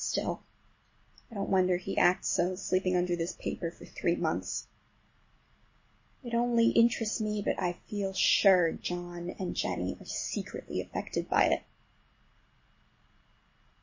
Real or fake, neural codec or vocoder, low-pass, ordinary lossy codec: real; none; 7.2 kHz; MP3, 32 kbps